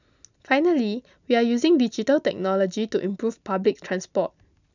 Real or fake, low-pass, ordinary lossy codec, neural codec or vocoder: real; 7.2 kHz; none; none